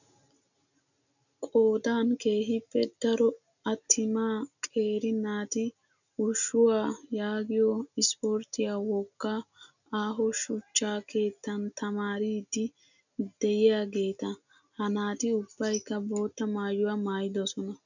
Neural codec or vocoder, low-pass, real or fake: none; 7.2 kHz; real